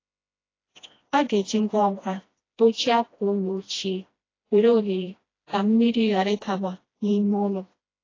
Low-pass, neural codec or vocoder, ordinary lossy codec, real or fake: 7.2 kHz; codec, 16 kHz, 1 kbps, FreqCodec, smaller model; AAC, 32 kbps; fake